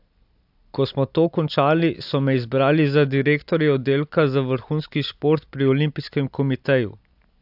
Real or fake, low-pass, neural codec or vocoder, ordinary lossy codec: real; 5.4 kHz; none; AAC, 48 kbps